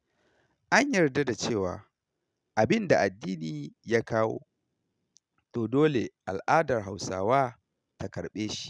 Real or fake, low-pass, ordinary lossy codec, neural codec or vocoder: real; none; none; none